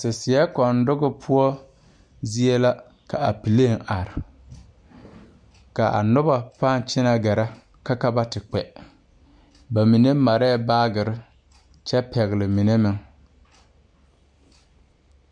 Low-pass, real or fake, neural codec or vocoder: 9.9 kHz; real; none